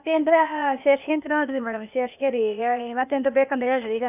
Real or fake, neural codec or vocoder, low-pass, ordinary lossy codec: fake; codec, 16 kHz, 0.8 kbps, ZipCodec; 3.6 kHz; none